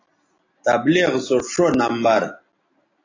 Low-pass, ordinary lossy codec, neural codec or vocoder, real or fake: 7.2 kHz; AAC, 32 kbps; none; real